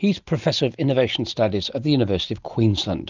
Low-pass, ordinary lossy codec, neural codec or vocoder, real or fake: 7.2 kHz; Opus, 32 kbps; none; real